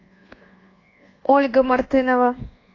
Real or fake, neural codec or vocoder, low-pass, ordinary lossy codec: fake; codec, 24 kHz, 1.2 kbps, DualCodec; 7.2 kHz; AAC, 32 kbps